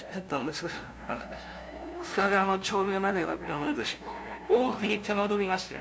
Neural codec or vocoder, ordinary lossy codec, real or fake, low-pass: codec, 16 kHz, 0.5 kbps, FunCodec, trained on LibriTTS, 25 frames a second; none; fake; none